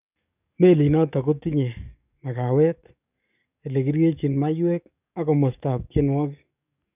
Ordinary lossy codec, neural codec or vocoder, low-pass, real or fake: none; codec, 44.1 kHz, 7.8 kbps, DAC; 3.6 kHz; fake